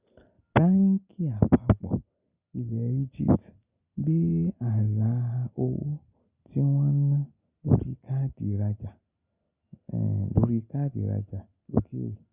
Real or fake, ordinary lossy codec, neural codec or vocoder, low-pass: real; Opus, 64 kbps; none; 3.6 kHz